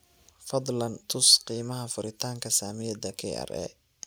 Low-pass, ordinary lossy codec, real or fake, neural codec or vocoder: none; none; real; none